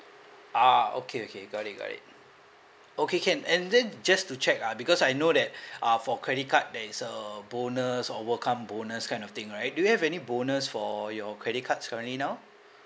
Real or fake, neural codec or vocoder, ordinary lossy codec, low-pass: real; none; none; none